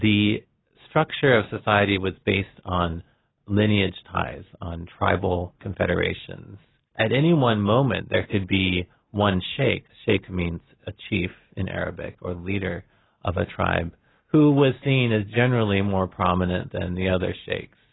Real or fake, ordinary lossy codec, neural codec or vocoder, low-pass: real; AAC, 16 kbps; none; 7.2 kHz